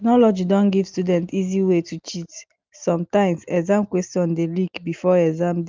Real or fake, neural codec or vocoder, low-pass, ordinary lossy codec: real; none; 7.2 kHz; Opus, 24 kbps